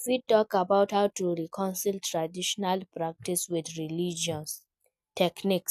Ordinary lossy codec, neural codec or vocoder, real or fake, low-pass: none; none; real; 14.4 kHz